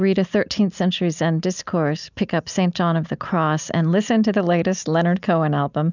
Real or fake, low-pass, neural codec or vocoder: real; 7.2 kHz; none